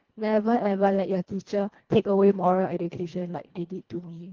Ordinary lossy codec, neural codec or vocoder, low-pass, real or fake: Opus, 16 kbps; codec, 24 kHz, 1.5 kbps, HILCodec; 7.2 kHz; fake